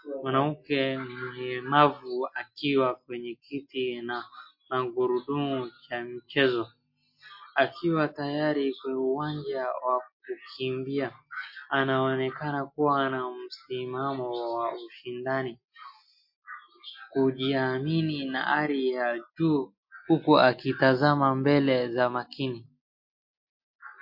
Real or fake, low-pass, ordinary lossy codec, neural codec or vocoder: real; 5.4 kHz; MP3, 32 kbps; none